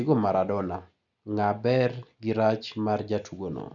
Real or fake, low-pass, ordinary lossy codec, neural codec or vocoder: real; 7.2 kHz; none; none